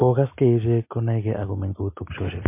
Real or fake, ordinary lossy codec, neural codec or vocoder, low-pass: real; MP3, 24 kbps; none; 3.6 kHz